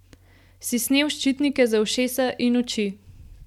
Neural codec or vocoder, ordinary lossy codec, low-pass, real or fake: none; none; 19.8 kHz; real